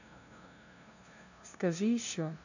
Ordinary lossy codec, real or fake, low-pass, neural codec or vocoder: none; fake; 7.2 kHz; codec, 16 kHz, 0.5 kbps, FunCodec, trained on LibriTTS, 25 frames a second